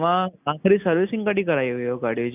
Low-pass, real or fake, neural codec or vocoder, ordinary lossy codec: 3.6 kHz; real; none; none